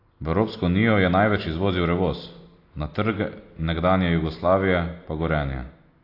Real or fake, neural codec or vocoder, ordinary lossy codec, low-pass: real; none; AAC, 32 kbps; 5.4 kHz